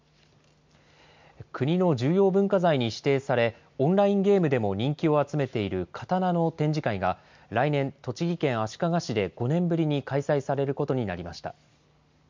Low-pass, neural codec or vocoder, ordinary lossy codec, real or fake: 7.2 kHz; none; MP3, 64 kbps; real